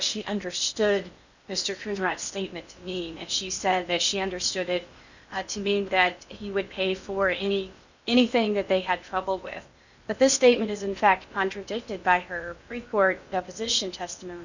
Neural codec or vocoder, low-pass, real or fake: codec, 16 kHz in and 24 kHz out, 0.8 kbps, FocalCodec, streaming, 65536 codes; 7.2 kHz; fake